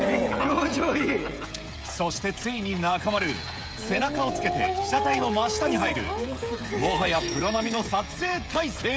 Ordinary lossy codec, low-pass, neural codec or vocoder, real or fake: none; none; codec, 16 kHz, 16 kbps, FreqCodec, smaller model; fake